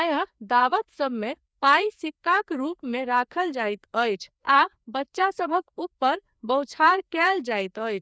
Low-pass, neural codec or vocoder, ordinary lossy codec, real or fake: none; codec, 16 kHz, 2 kbps, FreqCodec, larger model; none; fake